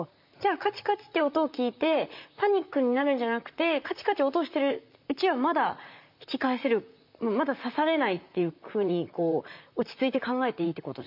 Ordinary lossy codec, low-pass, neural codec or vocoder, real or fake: MP3, 48 kbps; 5.4 kHz; vocoder, 44.1 kHz, 128 mel bands, Pupu-Vocoder; fake